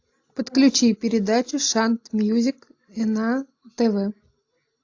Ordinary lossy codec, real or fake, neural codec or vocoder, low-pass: AAC, 48 kbps; real; none; 7.2 kHz